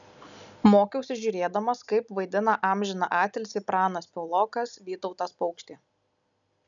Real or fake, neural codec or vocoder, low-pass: real; none; 7.2 kHz